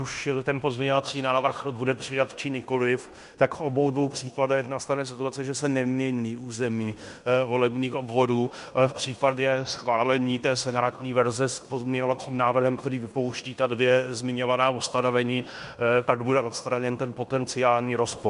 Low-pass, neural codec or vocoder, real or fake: 10.8 kHz; codec, 16 kHz in and 24 kHz out, 0.9 kbps, LongCat-Audio-Codec, fine tuned four codebook decoder; fake